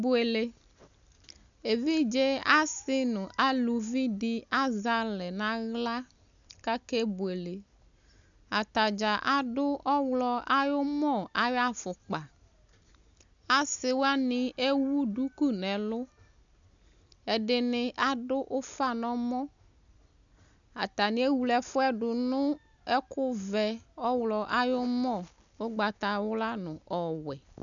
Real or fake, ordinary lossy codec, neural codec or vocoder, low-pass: real; MP3, 96 kbps; none; 7.2 kHz